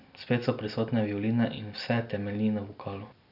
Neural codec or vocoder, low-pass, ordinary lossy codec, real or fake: none; 5.4 kHz; none; real